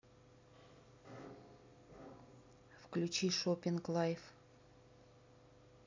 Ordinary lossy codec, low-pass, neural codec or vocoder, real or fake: MP3, 64 kbps; 7.2 kHz; none; real